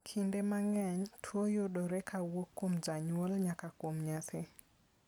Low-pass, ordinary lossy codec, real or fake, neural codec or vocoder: none; none; real; none